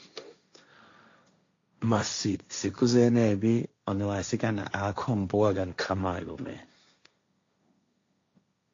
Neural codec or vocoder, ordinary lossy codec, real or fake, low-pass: codec, 16 kHz, 1.1 kbps, Voila-Tokenizer; AAC, 48 kbps; fake; 7.2 kHz